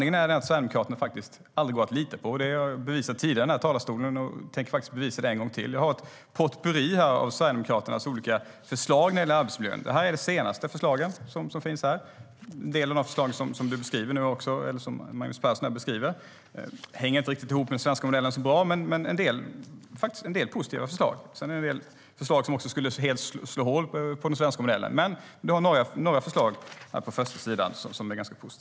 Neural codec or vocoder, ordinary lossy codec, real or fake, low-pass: none; none; real; none